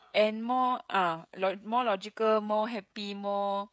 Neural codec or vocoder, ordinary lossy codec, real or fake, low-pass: codec, 16 kHz, 16 kbps, FreqCodec, smaller model; none; fake; none